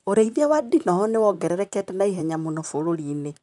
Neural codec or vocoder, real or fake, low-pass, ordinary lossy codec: vocoder, 44.1 kHz, 128 mel bands, Pupu-Vocoder; fake; 10.8 kHz; MP3, 96 kbps